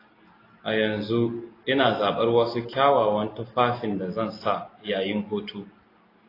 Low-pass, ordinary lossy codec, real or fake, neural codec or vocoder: 5.4 kHz; AAC, 24 kbps; real; none